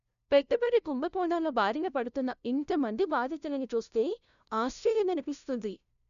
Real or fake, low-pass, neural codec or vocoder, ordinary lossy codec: fake; 7.2 kHz; codec, 16 kHz, 0.5 kbps, FunCodec, trained on LibriTTS, 25 frames a second; none